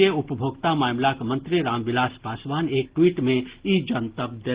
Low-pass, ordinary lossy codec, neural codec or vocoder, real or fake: 3.6 kHz; Opus, 16 kbps; none; real